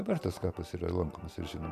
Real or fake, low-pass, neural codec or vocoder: real; 14.4 kHz; none